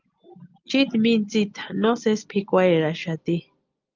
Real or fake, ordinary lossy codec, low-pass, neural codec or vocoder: real; Opus, 24 kbps; 7.2 kHz; none